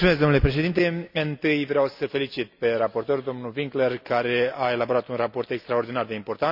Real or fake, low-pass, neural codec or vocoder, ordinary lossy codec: real; 5.4 kHz; none; none